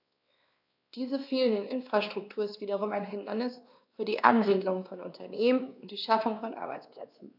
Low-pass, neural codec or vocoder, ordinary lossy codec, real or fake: 5.4 kHz; codec, 16 kHz, 2 kbps, X-Codec, WavLM features, trained on Multilingual LibriSpeech; AAC, 48 kbps; fake